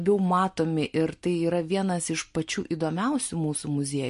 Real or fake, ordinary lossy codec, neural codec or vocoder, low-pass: real; MP3, 48 kbps; none; 10.8 kHz